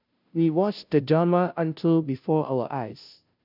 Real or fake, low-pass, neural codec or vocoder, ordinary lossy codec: fake; 5.4 kHz; codec, 16 kHz, 0.5 kbps, FunCodec, trained on Chinese and English, 25 frames a second; none